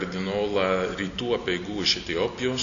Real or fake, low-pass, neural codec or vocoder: real; 7.2 kHz; none